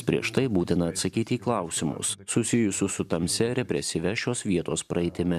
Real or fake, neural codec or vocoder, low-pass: fake; vocoder, 44.1 kHz, 128 mel bands every 512 samples, BigVGAN v2; 14.4 kHz